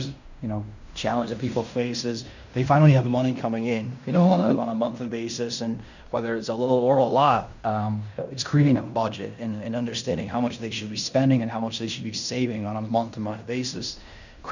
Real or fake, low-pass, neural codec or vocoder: fake; 7.2 kHz; codec, 16 kHz in and 24 kHz out, 0.9 kbps, LongCat-Audio-Codec, fine tuned four codebook decoder